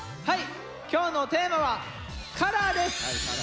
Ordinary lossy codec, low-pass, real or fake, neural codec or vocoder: none; none; real; none